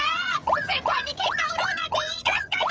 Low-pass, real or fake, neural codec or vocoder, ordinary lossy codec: none; fake; codec, 16 kHz, 16 kbps, FreqCodec, smaller model; none